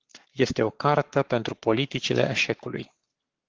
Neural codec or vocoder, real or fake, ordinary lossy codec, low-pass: codec, 44.1 kHz, 7.8 kbps, Pupu-Codec; fake; Opus, 16 kbps; 7.2 kHz